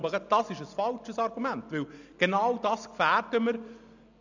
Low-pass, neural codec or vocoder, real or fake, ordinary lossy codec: 7.2 kHz; none; real; none